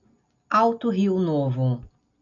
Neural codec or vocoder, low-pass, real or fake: none; 7.2 kHz; real